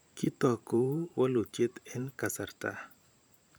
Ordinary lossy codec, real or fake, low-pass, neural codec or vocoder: none; fake; none; vocoder, 44.1 kHz, 128 mel bands every 512 samples, BigVGAN v2